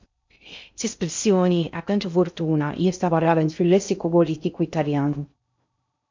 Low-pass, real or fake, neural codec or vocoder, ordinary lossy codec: 7.2 kHz; fake; codec, 16 kHz in and 24 kHz out, 0.6 kbps, FocalCodec, streaming, 2048 codes; MP3, 64 kbps